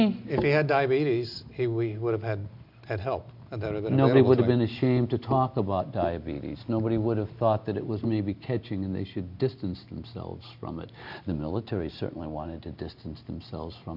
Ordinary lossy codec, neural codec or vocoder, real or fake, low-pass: MP3, 48 kbps; none; real; 5.4 kHz